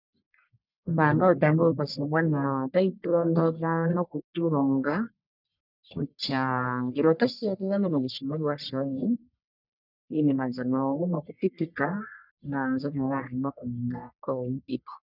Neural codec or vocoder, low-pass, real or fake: codec, 44.1 kHz, 1.7 kbps, Pupu-Codec; 5.4 kHz; fake